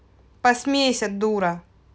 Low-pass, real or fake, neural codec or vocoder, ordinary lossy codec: none; real; none; none